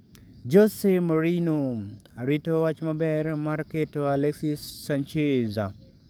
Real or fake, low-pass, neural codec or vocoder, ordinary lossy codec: fake; none; codec, 44.1 kHz, 7.8 kbps, DAC; none